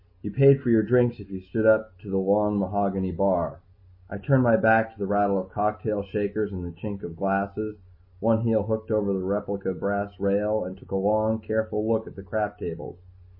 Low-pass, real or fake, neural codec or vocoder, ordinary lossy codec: 5.4 kHz; real; none; MP3, 24 kbps